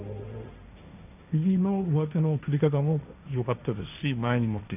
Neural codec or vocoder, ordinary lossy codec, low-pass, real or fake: codec, 16 kHz, 1.1 kbps, Voila-Tokenizer; none; 3.6 kHz; fake